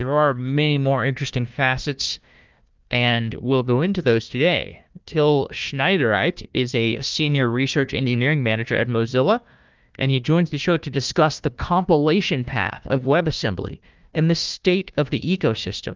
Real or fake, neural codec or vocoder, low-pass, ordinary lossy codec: fake; codec, 16 kHz, 1 kbps, FunCodec, trained on Chinese and English, 50 frames a second; 7.2 kHz; Opus, 24 kbps